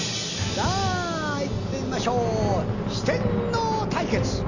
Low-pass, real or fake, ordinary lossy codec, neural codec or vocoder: 7.2 kHz; real; none; none